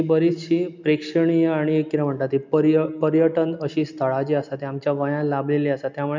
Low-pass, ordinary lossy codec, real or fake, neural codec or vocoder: 7.2 kHz; MP3, 64 kbps; real; none